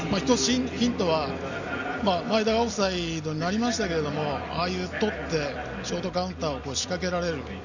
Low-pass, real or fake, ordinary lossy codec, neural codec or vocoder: 7.2 kHz; real; none; none